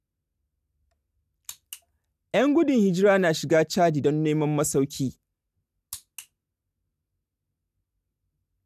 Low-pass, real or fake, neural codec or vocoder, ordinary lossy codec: 14.4 kHz; real; none; none